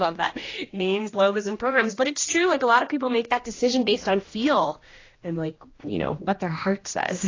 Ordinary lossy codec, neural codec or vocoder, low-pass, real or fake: AAC, 32 kbps; codec, 16 kHz, 1 kbps, X-Codec, HuBERT features, trained on general audio; 7.2 kHz; fake